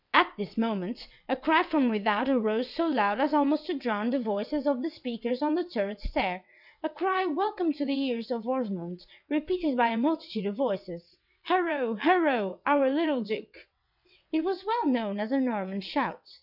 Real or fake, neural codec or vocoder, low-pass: fake; vocoder, 22.05 kHz, 80 mel bands, WaveNeXt; 5.4 kHz